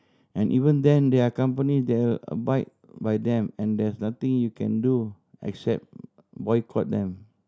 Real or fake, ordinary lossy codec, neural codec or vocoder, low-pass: real; none; none; none